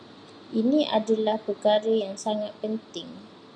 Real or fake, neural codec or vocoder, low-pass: real; none; 9.9 kHz